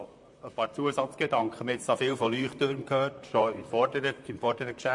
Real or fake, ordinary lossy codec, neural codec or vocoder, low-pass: fake; MP3, 48 kbps; vocoder, 44.1 kHz, 128 mel bands, Pupu-Vocoder; 10.8 kHz